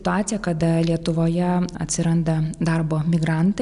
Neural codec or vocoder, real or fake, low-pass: none; real; 10.8 kHz